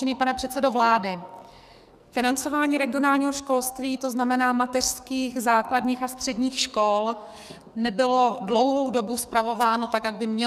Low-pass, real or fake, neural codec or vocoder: 14.4 kHz; fake; codec, 44.1 kHz, 2.6 kbps, SNAC